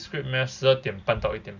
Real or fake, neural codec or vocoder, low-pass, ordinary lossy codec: real; none; 7.2 kHz; none